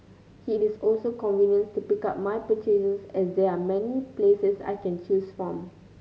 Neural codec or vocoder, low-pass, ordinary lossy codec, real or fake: none; none; none; real